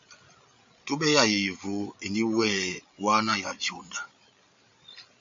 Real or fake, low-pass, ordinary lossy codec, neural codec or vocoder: fake; 7.2 kHz; MP3, 48 kbps; codec, 16 kHz, 8 kbps, FreqCodec, larger model